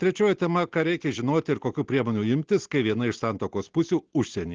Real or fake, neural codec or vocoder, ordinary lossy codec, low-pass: real; none; Opus, 16 kbps; 7.2 kHz